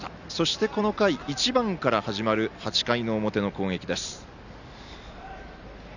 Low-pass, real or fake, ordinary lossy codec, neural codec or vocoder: 7.2 kHz; real; none; none